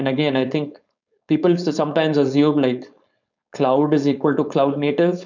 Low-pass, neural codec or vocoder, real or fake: 7.2 kHz; codec, 16 kHz, 4.8 kbps, FACodec; fake